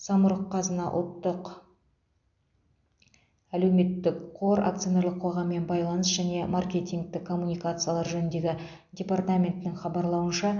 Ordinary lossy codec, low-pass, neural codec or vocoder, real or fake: AAC, 64 kbps; 7.2 kHz; none; real